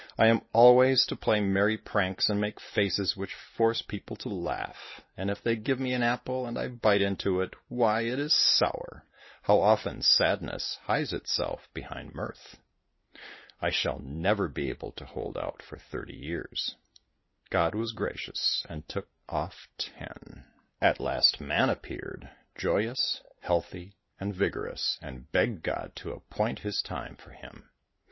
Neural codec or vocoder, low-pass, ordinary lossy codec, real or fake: none; 7.2 kHz; MP3, 24 kbps; real